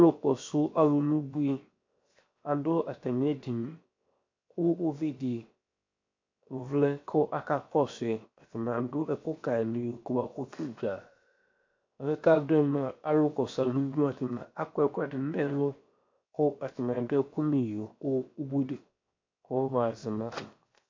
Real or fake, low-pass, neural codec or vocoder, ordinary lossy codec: fake; 7.2 kHz; codec, 16 kHz, 0.7 kbps, FocalCodec; MP3, 48 kbps